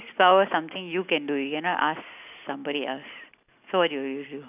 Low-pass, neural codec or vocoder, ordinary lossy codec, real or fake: 3.6 kHz; none; none; real